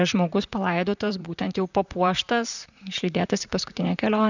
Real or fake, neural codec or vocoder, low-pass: fake; vocoder, 44.1 kHz, 128 mel bands, Pupu-Vocoder; 7.2 kHz